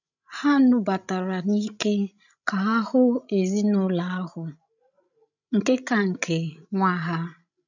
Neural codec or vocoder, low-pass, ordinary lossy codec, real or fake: codec, 16 kHz, 16 kbps, FreqCodec, larger model; 7.2 kHz; none; fake